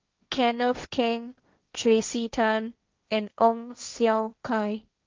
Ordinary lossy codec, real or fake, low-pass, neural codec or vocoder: Opus, 24 kbps; fake; 7.2 kHz; codec, 16 kHz, 1.1 kbps, Voila-Tokenizer